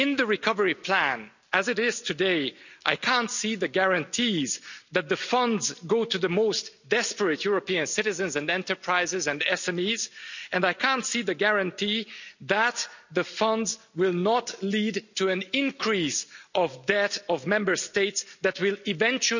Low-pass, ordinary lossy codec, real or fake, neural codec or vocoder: 7.2 kHz; none; real; none